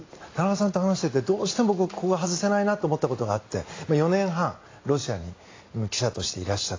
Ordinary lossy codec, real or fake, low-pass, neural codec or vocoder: AAC, 32 kbps; real; 7.2 kHz; none